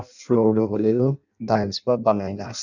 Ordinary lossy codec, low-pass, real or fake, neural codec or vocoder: none; 7.2 kHz; fake; codec, 16 kHz in and 24 kHz out, 0.6 kbps, FireRedTTS-2 codec